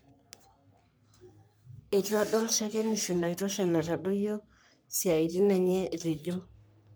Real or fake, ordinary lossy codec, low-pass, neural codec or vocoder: fake; none; none; codec, 44.1 kHz, 3.4 kbps, Pupu-Codec